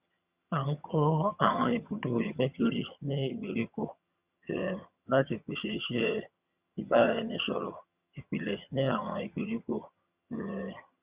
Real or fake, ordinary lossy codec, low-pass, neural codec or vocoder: fake; Opus, 64 kbps; 3.6 kHz; vocoder, 22.05 kHz, 80 mel bands, HiFi-GAN